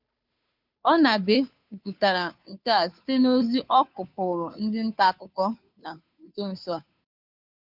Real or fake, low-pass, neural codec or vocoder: fake; 5.4 kHz; codec, 16 kHz, 2 kbps, FunCodec, trained on Chinese and English, 25 frames a second